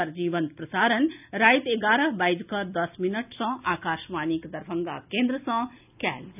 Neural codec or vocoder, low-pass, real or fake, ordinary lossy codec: none; 3.6 kHz; real; none